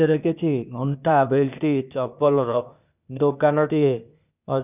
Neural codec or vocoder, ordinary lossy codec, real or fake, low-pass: codec, 16 kHz, 0.8 kbps, ZipCodec; none; fake; 3.6 kHz